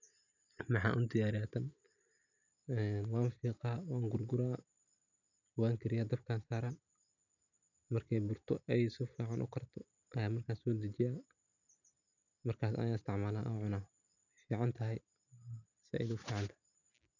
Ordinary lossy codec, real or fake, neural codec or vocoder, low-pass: none; real; none; 7.2 kHz